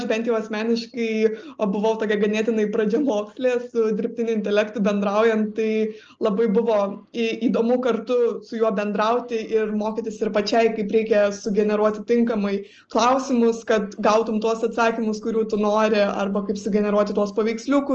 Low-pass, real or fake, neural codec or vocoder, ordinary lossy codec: 7.2 kHz; real; none; Opus, 24 kbps